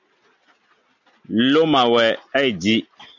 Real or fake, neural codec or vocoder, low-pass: real; none; 7.2 kHz